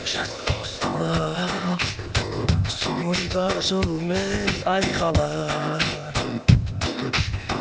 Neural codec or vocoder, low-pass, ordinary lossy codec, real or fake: codec, 16 kHz, 0.8 kbps, ZipCodec; none; none; fake